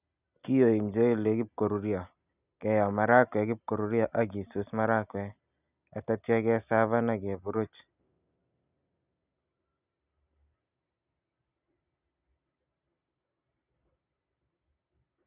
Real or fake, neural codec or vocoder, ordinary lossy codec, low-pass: real; none; none; 3.6 kHz